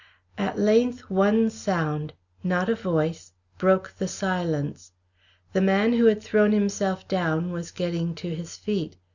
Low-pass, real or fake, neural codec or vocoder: 7.2 kHz; real; none